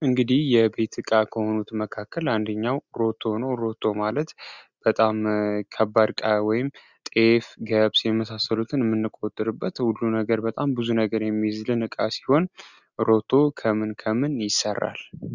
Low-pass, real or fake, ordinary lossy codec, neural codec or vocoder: 7.2 kHz; real; Opus, 64 kbps; none